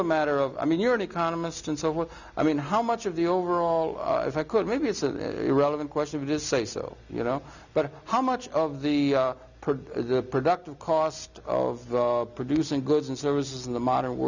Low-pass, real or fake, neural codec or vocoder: 7.2 kHz; real; none